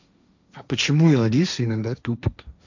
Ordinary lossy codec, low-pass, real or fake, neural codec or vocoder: none; 7.2 kHz; fake; codec, 16 kHz, 1.1 kbps, Voila-Tokenizer